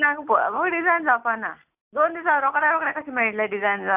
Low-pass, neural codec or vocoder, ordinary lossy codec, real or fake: 3.6 kHz; none; AAC, 32 kbps; real